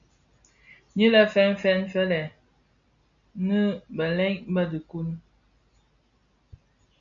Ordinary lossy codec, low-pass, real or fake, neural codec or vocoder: MP3, 64 kbps; 7.2 kHz; real; none